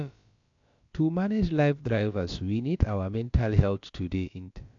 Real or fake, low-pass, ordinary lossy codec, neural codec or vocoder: fake; 7.2 kHz; AAC, 48 kbps; codec, 16 kHz, about 1 kbps, DyCAST, with the encoder's durations